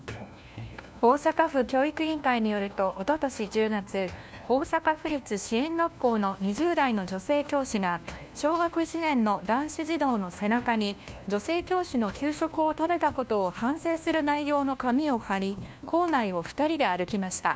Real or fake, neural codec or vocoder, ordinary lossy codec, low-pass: fake; codec, 16 kHz, 1 kbps, FunCodec, trained on LibriTTS, 50 frames a second; none; none